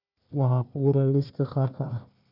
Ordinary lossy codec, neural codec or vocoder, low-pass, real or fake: none; codec, 16 kHz, 1 kbps, FunCodec, trained on Chinese and English, 50 frames a second; 5.4 kHz; fake